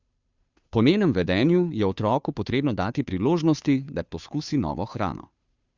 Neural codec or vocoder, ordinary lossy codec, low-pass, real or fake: codec, 16 kHz, 2 kbps, FunCodec, trained on Chinese and English, 25 frames a second; Opus, 64 kbps; 7.2 kHz; fake